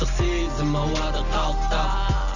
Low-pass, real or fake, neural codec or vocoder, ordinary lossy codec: 7.2 kHz; real; none; none